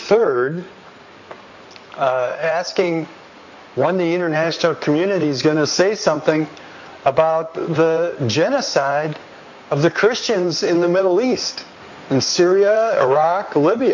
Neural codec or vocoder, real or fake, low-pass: codec, 16 kHz in and 24 kHz out, 2.2 kbps, FireRedTTS-2 codec; fake; 7.2 kHz